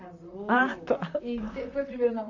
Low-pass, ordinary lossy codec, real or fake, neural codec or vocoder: 7.2 kHz; none; fake; vocoder, 44.1 kHz, 128 mel bands, Pupu-Vocoder